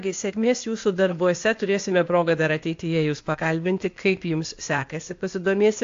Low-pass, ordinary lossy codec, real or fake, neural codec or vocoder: 7.2 kHz; AAC, 48 kbps; fake; codec, 16 kHz, 0.8 kbps, ZipCodec